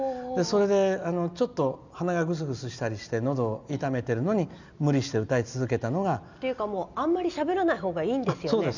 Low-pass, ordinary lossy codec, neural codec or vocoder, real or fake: 7.2 kHz; none; none; real